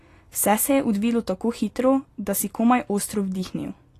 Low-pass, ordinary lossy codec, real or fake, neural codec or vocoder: 14.4 kHz; AAC, 48 kbps; real; none